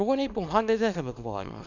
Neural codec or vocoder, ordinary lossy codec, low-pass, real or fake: codec, 24 kHz, 0.9 kbps, WavTokenizer, small release; none; 7.2 kHz; fake